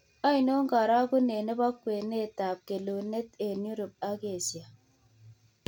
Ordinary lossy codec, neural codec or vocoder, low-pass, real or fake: none; none; 19.8 kHz; real